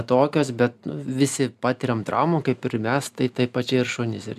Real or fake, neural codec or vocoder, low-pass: real; none; 14.4 kHz